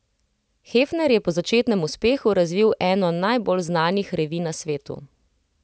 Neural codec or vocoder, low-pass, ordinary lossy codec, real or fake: none; none; none; real